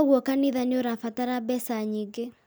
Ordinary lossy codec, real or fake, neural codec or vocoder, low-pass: none; real; none; none